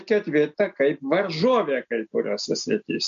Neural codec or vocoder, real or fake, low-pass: none; real; 7.2 kHz